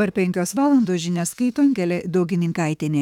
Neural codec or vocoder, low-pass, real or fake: autoencoder, 48 kHz, 32 numbers a frame, DAC-VAE, trained on Japanese speech; 19.8 kHz; fake